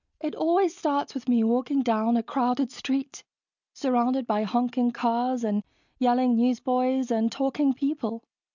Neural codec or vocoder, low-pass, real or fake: none; 7.2 kHz; real